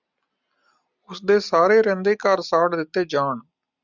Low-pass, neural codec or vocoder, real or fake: 7.2 kHz; none; real